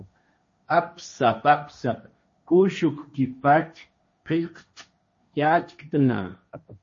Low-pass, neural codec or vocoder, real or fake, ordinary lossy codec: 7.2 kHz; codec, 16 kHz, 1.1 kbps, Voila-Tokenizer; fake; MP3, 32 kbps